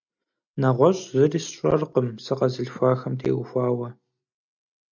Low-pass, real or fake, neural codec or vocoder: 7.2 kHz; real; none